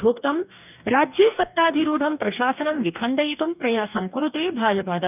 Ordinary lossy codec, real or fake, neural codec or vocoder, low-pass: none; fake; codec, 44.1 kHz, 2.6 kbps, DAC; 3.6 kHz